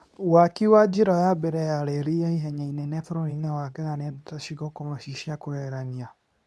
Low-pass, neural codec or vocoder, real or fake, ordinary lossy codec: none; codec, 24 kHz, 0.9 kbps, WavTokenizer, medium speech release version 2; fake; none